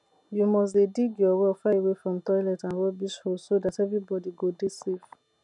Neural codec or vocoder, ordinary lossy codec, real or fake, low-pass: none; none; real; 10.8 kHz